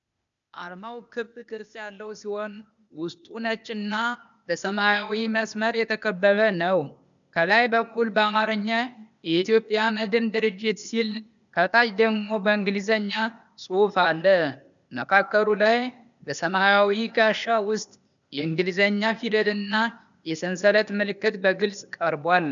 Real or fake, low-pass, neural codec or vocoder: fake; 7.2 kHz; codec, 16 kHz, 0.8 kbps, ZipCodec